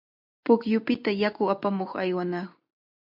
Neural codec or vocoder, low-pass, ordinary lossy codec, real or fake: none; 5.4 kHz; MP3, 48 kbps; real